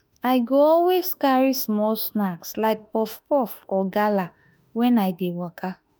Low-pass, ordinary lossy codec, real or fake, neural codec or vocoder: none; none; fake; autoencoder, 48 kHz, 32 numbers a frame, DAC-VAE, trained on Japanese speech